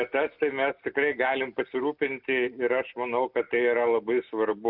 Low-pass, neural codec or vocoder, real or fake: 5.4 kHz; none; real